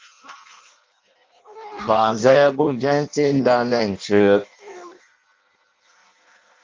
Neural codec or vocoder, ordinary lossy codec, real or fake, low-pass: codec, 16 kHz in and 24 kHz out, 0.6 kbps, FireRedTTS-2 codec; Opus, 32 kbps; fake; 7.2 kHz